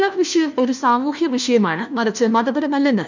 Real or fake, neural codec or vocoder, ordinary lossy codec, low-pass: fake; codec, 16 kHz, 1 kbps, FunCodec, trained on LibriTTS, 50 frames a second; none; 7.2 kHz